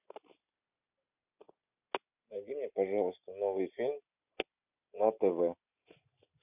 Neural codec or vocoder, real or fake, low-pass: none; real; 3.6 kHz